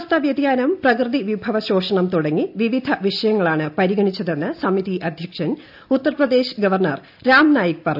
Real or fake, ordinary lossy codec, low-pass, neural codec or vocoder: real; AAC, 48 kbps; 5.4 kHz; none